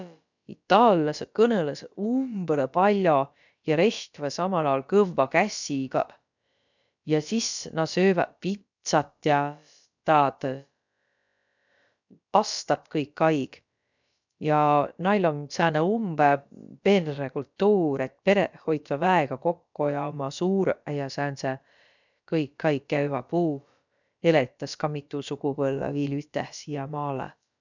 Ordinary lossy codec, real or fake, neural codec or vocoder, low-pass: none; fake; codec, 16 kHz, about 1 kbps, DyCAST, with the encoder's durations; 7.2 kHz